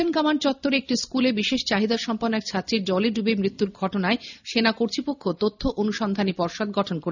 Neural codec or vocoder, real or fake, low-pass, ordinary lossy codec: none; real; 7.2 kHz; none